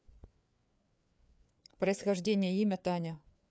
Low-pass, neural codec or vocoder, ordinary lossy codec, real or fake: none; codec, 16 kHz, 4 kbps, FreqCodec, larger model; none; fake